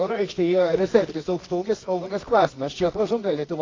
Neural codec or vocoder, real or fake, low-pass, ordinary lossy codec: codec, 24 kHz, 0.9 kbps, WavTokenizer, medium music audio release; fake; 7.2 kHz; AAC, 32 kbps